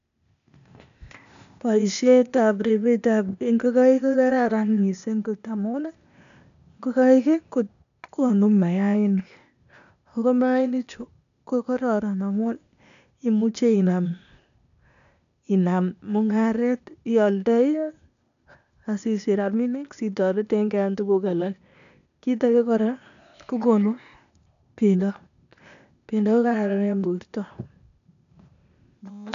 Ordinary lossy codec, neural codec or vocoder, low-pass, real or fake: none; codec, 16 kHz, 0.8 kbps, ZipCodec; 7.2 kHz; fake